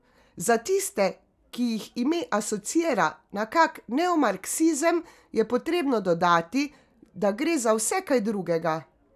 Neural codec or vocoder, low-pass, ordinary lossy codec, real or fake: none; 14.4 kHz; none; real